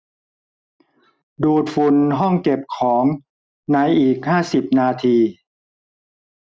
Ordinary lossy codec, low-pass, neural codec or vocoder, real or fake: none; none; none; real